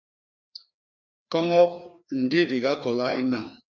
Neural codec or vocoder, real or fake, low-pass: codec, 16 kHz, 2 kbps, FreqCodec, larger model; fake; 7.2 kHz